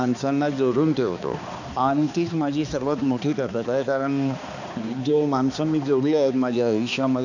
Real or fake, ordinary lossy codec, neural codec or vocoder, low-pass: fake; none; codec, 16 kHz, 2 kbps, X-Codec, HuBERT features, trained on balanced general audio; 7.2 kHz